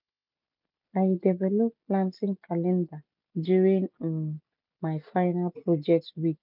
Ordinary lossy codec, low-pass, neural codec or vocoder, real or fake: none; 5.4 kHz; none; real